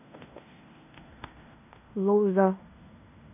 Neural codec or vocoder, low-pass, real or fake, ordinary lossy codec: codec, 16 kHz in and 24 kHz out, 0.9 kbps, LongCat-Audio-Codec, fine tuned four codebook decoder; 3.6 kHz; fake; none